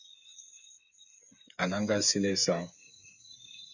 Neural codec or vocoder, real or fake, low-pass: codec, 16 kHz, 8 kbps, FreqCodec, smaller model; fake; 7.2 kHz